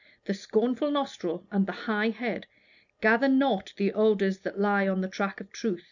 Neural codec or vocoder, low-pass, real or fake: none; 7.2 kHz; real